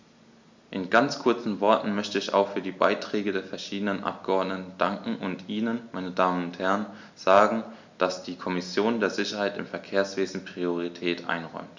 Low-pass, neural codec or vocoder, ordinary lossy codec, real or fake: 7.2 kHz; none; MP3, 64 kbps; real